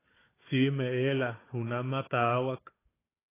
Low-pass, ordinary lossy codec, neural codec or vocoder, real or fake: 3.6 kHz; AAC, 16 kbps; codec, 16 kHz, 4 kbps, FunCodec, trained on Chinese and English, 50 frames a second; fake